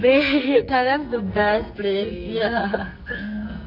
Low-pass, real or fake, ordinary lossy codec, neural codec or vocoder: 5.4 kHz; fake; none; codec, 44.1 kHz, 2.6 kbps, SNAC